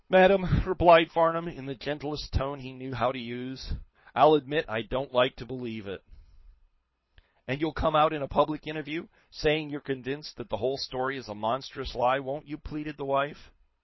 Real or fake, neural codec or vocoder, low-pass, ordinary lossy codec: fake; codec, 24 kHz, 6 kbps, HILCodec; 7.2 kHz; MP3, 24 kbps